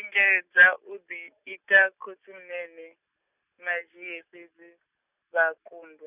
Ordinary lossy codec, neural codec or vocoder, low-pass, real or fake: none; none; 3.6 kHz; real